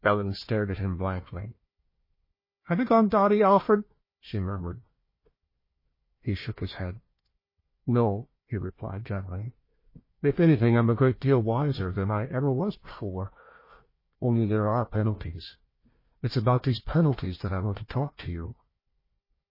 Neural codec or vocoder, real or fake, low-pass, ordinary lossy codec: codec, 16 kHz, 1 kbps, FunCodec, trained on Chinese and English, 50 frames a second; fake; 5.4 kHz; MP3, 24 kbps